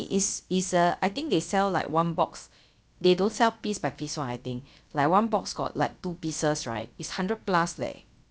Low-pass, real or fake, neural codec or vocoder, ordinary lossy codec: none; fake; codec, 16 kHz, about 1 kbps, DyCAST, with the encoder's durations; none